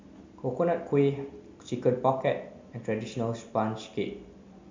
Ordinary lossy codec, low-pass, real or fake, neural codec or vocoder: MP3, 64 kbps; 7.2 kHz; real; none